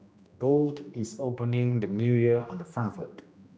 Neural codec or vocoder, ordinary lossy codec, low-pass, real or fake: codec, 16 kHz, 1 kbps, X-Codec, HuBERT features, trained on general audio; none; none; fake